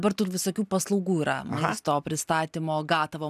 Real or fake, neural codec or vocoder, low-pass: real; none; 14.4 kHz